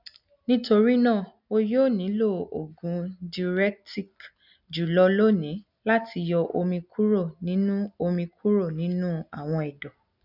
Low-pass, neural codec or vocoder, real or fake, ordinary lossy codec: 5.4 kHz; none; real; none